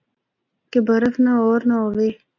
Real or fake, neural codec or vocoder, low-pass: real; none; 7.2 kHz